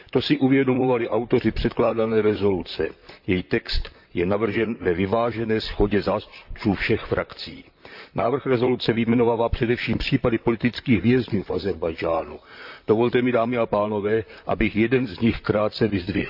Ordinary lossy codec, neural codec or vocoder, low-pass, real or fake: AAC, 48 kbps; vocoder, 44.1 kHz, 128 mel bands, Pupu-Vocoder; 5.4 kHz; fake